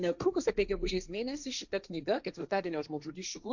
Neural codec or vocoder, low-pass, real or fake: codec, 16 kHz, 1.1 kbps, Voila-Tokenizer; 7.2 kHz; fake